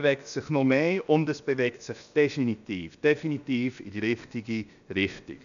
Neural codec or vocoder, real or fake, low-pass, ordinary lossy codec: codec, 16 kHz, about 1 kbps, DyCAST, with the encoder's durations; fake; 7.2 kHz; none